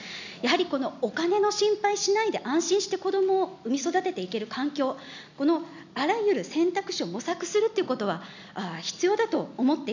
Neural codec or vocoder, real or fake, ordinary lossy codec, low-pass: none; real; none; 7.2 kHz